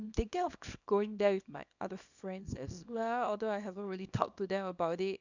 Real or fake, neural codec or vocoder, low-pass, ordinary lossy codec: fake; codec, 24 kHz, 0.9 kbps, WavTokenizer, small release; 7.2 kHz; none